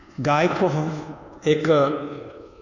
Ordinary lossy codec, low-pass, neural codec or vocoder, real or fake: none; 7.2 kHz; codec, 24 kHz, 1.2 kbps, DualCodec; fake